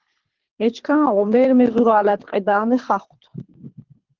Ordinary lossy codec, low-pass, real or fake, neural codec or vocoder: Opus, 16 kbps; 7.2 kHz; fake; codec, 24 kHz, 3 kbps, HILCodec